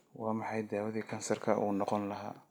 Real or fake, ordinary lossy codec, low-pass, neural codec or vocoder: real; none; none; none